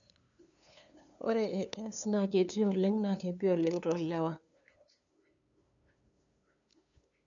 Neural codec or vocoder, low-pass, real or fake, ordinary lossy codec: codec, 16 kHz, 2 kbps, FunCodec, trained on LibriTTS, 25 frames a second; 7.2 kHz; fake; none